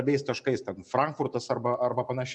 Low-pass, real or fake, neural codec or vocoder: 10.8 kHz; real; none